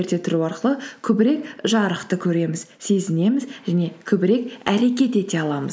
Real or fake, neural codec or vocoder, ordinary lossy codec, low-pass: real; none; none; none